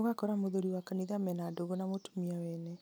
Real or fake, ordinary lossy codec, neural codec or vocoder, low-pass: real; none; none; none